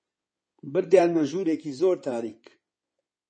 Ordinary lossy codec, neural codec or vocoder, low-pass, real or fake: MP3, 32 kbps; codec, 16 kHz in and 24 kHz out, 2.2 kbps, FireRedTTS-2 codec; 9.9 kHz; fake